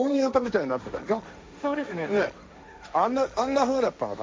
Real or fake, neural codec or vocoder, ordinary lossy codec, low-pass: fake; codec, 16 kHz, 1.1 kbps, Voila-Tokenizer; none; none